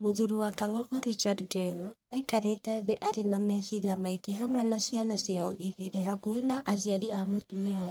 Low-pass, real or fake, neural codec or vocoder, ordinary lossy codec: none; fake; codec, 44.1 kHz, 1.7 kbps, Pupu-Codec; none